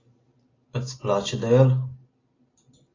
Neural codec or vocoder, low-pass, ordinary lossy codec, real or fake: none; 7.2 kHz; AAC, 32 kbps; real